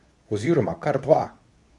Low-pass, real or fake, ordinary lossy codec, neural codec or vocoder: 10.8 kHz; fake; none; codec, 24 kHz, 0.9 kbps, WavTokenizer, medium speech release version 2